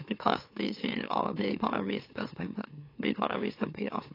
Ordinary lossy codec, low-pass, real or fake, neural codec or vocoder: AAC, 32 kbps; 5.4 kHz; fake; autoencoder, 44.1 kHz, a latent of 192 numbers a frame, MeloTTS